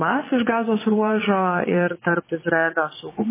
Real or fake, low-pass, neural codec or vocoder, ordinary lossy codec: fake; 3.6 kHz; vocoder, 22.05 kHz, 80 mel bands, Vocos; MP3, 16 kbps